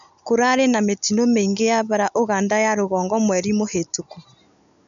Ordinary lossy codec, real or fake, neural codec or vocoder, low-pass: none; real; none; 7.2 kHz